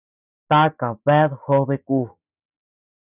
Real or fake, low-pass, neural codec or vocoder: real; 3.6 kHz; none